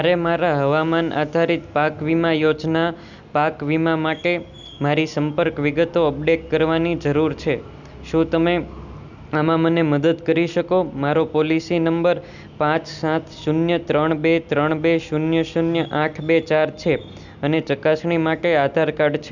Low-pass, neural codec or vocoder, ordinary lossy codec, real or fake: 7.2 kHz; none; none; real